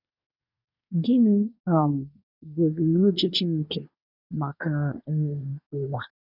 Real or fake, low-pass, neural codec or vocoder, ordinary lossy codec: fake; 5.4 kHz; codec, 24 kHz, 1 kbps, SNAC; none